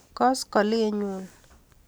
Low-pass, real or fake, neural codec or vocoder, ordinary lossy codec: none; real; none; none